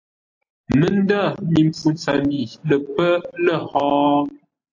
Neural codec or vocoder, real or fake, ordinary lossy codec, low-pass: none; real; AAC, 48 kbps; 7.2 kHz